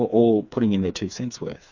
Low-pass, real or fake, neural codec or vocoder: 7.2 kHz; fake; codec, 16 kHz, 4 kbps, FreqCodec, smaller model